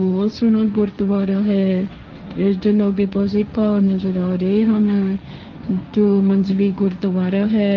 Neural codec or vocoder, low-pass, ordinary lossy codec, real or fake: codec, 16 kHz, 1.1 kbps, Voila-Tokenizer; 7.2 kHz; Opus, 24 kbps; fake